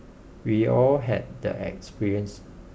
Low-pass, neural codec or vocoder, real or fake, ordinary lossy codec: none; none; real; none